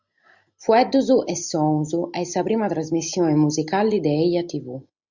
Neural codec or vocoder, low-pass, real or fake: none; 7.2 kHz; real